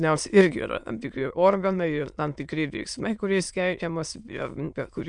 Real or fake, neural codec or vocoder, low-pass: fake; autoencoder, 22.05 kHz, a latent of 192 numbers a frame, VITS, trained on many speakers; 9.9 kHz